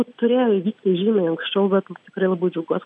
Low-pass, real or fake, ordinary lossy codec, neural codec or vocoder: 9.9 kHz; real; MP3, 96 kbps; none